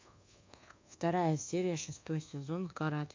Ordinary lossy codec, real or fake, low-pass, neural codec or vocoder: MP3, 64 kbps; fake; 7.2 kHz; codec, 24 kHz, 1.2 kbps, DualCodec